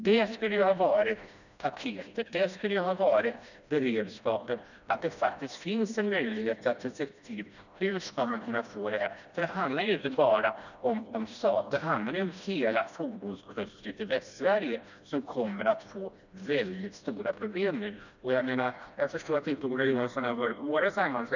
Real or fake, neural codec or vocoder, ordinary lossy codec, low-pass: fake; codec, 16 kHz, 1 kbps, FreqCodec, smaller model; none; 7.2 kHz